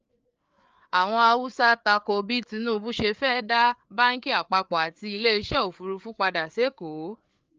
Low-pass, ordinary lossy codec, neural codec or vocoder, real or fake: 7.2 kHz; Opus, 24 kbps; codec, 16 kHz, 4 kbps, FreqCodec, larger model; fake